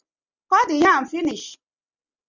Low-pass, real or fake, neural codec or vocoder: 7.2 kHz; real; none